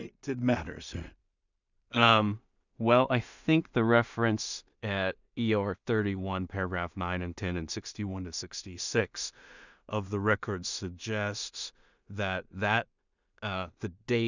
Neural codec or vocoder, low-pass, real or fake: codec, 16 kHz in and 24 kHz out, 0.4 kbps, LongCat-Audio-Codec, two codebook decoder; 7.2 kHz; fake